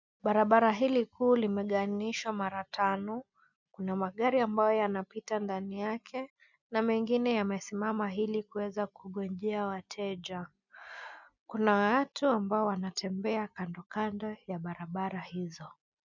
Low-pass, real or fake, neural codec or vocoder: 7.2 kHz; real; none